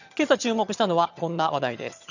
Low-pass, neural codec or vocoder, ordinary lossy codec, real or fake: 7.2 kHz; vocoder, 22.05 kHz, 80 mel bands, HiFi-GAN; none; fake